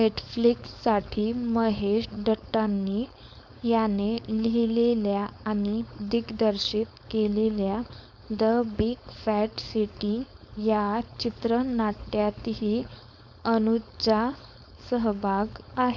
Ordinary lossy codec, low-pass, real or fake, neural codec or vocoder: none; none; fake; codec, 16 kHz, 4.8 kbps, FACodec